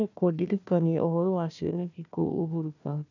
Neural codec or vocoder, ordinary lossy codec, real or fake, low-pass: codec, 16 kHz, 1 kbps, FunCodec, trained on Chinese and English, 50 frames a second; none; fake; 7.2 kHz